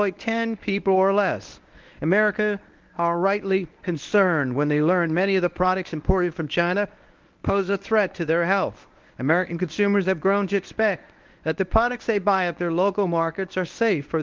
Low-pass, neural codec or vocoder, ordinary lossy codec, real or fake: 7.2 kHz; codec, 24 kHz, 0.9 kbps, WavTokenizer, medium speech release version 1; Opus, 32 kbps; fake